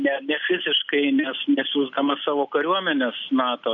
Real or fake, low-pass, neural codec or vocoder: real; 7.2 kHz; none